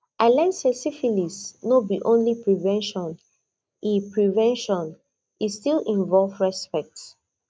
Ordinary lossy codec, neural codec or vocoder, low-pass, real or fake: none; none; none; real